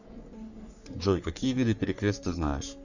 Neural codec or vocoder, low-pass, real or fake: codec, 44.1 kHz, 3.4 kbps, Pupu-Codec; 7.2 kHz; fake